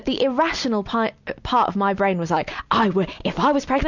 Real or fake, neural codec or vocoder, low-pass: real; none; 7.2 kHz